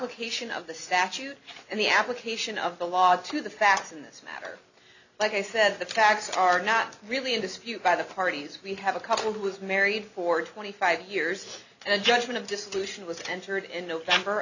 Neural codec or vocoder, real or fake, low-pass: none; real; 7.2 kHz